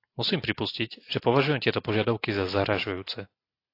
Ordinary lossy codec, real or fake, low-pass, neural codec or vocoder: AAC, 32 kbps; real; 5.4 kHz; none